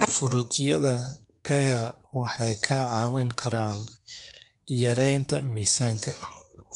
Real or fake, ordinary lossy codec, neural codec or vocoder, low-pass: fake; none; codec, 24 kHz, 1 kbps, SNAC; 10.8 kHz